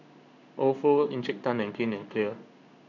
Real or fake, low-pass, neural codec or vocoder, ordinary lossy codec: fake; 7.2 kHz; codec, 16 kHz in and 24 kHz out, 1 kbps, XY-Tokenizer; none